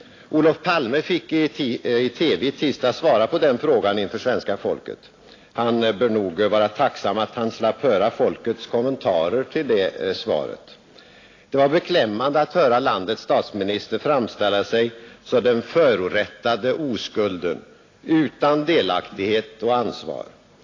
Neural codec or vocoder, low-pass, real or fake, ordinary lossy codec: none; 7.2 kHz; real; AAC, 32 kbps